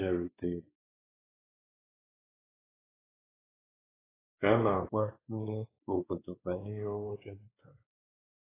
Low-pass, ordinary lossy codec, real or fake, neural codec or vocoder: 3.6 kHz; AAC, 16 kbps; fake; codec, 16 kHz, 2 kbps, X-Codec, WavLM features, trained on Multilingual LibriSpeech